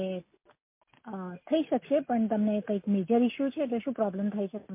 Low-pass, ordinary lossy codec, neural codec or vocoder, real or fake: 3.6 kHz; MP3, 24 kbps; none; real